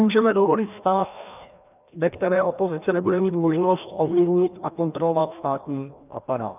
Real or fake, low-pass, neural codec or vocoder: fake; 3.6 kHz; codec, 16 kHz, 1 kbps, FreqCodec, larger model